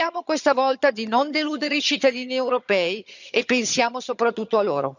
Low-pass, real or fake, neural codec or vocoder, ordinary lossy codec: 7.2 kHz; fake; vocoder, 22.05 kHz, 80 mel bands, HiFi-GAN; none